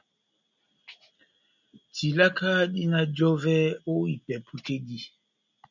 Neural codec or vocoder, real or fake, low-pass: none; real; 7.2 kHz